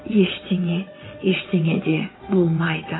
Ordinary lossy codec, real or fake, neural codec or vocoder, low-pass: AAC, 16 kbps; fake; vocoder, 22.05 kHz, 80 mel bands, WaveNeXt; 7.2 kHz